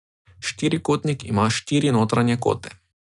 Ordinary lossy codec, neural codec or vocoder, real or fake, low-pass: none; none; real; 10.8 kHz